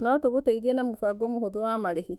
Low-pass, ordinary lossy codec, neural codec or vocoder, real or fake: 19.8 kHz; none; autoencoder, 48 kHz, 32 numbers a frame, DAC-VAE, trained on Japanese speech; fake